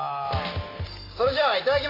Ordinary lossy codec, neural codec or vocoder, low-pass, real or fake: AAC, 24 kbps; none; 5.4 kHz; real